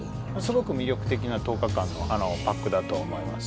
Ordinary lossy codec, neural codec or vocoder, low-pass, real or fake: none; none; none; real